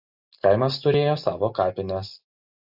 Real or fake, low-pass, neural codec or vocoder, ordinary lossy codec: real; 5.4 kHz; none; AAC, 48 kbps